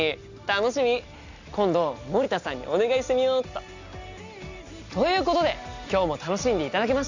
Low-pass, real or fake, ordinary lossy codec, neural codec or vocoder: 7.2 kHz; real; Opus, 64 kbps; none